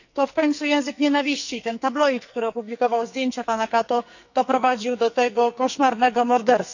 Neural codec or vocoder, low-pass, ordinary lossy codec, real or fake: codec, 44.1 kHz, 2.6 kbps, SNAC; 7.2 kHz; none; fake